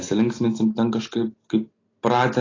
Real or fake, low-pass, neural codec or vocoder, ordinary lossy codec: real; 7.2 kHz; none; AAC, 32 kbps